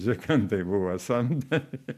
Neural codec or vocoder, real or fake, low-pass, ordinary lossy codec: none; real; 14.4 kHz; MP3, 96 kbps